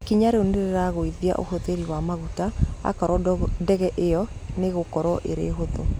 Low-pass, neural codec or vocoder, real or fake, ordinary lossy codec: 19.8 kHz; none; real; none